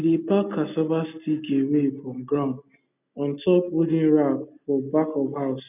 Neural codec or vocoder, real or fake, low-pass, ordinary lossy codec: none; real; 3.6 kHz; none